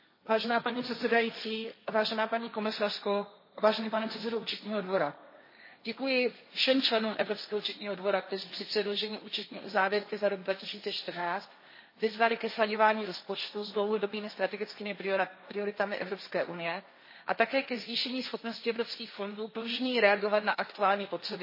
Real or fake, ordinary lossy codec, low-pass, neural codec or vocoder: fake; MP3, 24 kbps; 5.4 kHz; codec, 16 kHz, 1.1 kbps, Voila-Tokenizer